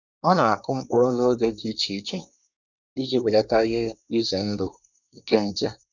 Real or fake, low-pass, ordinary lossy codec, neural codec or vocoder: fake; 7.2 kHz; none; codec, 24 kHz, 1 kbps, SNAC